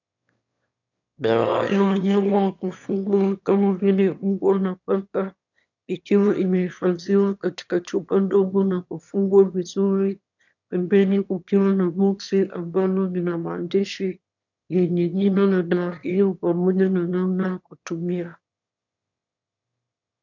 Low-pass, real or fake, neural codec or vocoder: 7.2 kHz; fake; autoencoder, 22.05 kHz, a latent of 192 numbers a frame, VITS, trained on one speaker